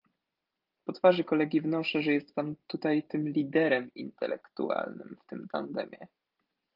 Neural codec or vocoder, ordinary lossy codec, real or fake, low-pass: none; Opus, 32 kbps; real; 5.4 kHz